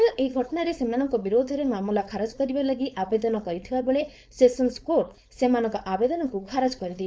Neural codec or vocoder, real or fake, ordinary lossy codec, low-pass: codec, 16 kHz, 4.8 kbps, FACodec; fake; none; none